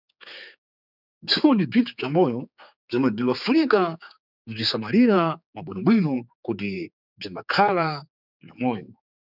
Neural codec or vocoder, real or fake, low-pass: codec, 16 kHz, 4 kbps, X-Codec, HuBERT features, trained on general audio; fake; 5.4 kHz